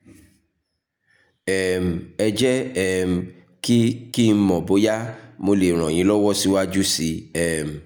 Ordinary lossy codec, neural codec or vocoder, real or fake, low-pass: none; none; real; none